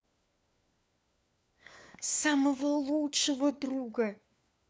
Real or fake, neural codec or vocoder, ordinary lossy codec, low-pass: fake; codec, 16 kHz, 4 kbps, FunCodec, trained on LibriTTS, 50 frames a second; none; none